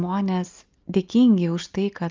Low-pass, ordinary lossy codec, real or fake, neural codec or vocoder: 7.2 kHz; Opus, 24 kbps; real; none